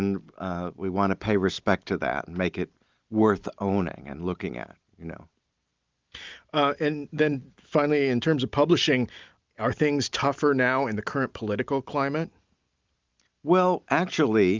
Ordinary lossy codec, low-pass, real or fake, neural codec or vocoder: Opus, 32 kbps; 7.2 kHz; real; none